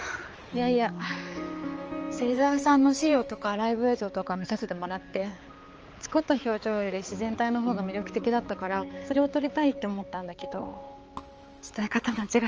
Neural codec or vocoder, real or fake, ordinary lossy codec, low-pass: codec, 16 kHz, 4 kbps, X-Codec, HuBERT features, trained on balanced general audio; fake; Opus, 24 kbps; 7.2 kHz